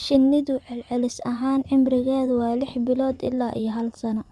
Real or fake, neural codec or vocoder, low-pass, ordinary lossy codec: fake; vocoder, 24 kHz, 100 mel bands, Vocos; none; none